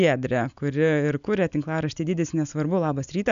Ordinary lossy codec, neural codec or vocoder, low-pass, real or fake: AAC, 96 kbps; none; 7.2 kHz; real